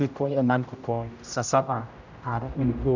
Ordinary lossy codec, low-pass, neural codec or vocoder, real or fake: none; 7.2 kHz; codec, 16 kHz, 0.5 kbps, X-Codec, HuBERT features, trained on general audio; fake